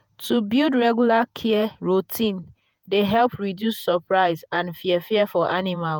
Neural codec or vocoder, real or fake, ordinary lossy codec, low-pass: vocoder, 48 kHz, 128 mel bands, Vocos; fake; none; none